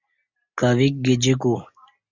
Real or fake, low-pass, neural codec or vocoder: real; 7.2 kHz; none